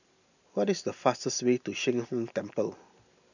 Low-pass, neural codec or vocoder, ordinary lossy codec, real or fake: 7.2 kHz; none; none; real